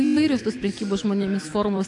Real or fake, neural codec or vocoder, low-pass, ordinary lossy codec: fake; autoencoder, 48 kHz, 128 numbers a frame, DAC-VAE, trained on Japanese speech; 10.8 kHz; AAC, 48 kbps